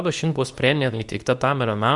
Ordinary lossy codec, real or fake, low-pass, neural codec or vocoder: Opus, 64 kbps; fake; 10.8 kHz; codec, 24 kHz, 0.9 kbps, WavTokenizer, medium speech release version 2